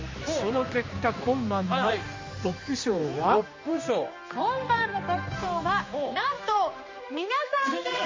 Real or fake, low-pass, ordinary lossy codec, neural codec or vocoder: fake; 7.2 kHz; MP3, 32 kbps; codec, 16 kHz, 2 kbps, X-Codec, HuBERT features, trained on general audio